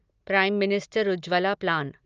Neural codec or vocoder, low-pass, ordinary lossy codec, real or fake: none; 7.2 kHz; Opus, 24 kbps; real